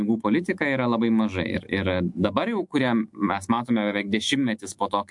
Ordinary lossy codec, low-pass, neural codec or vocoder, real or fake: MP3, 64 kbps; 10.8 kHz; autoencoder, 48 kHz, 128 numbers a frame, DAC-VAE, trained on Japanese speech; fake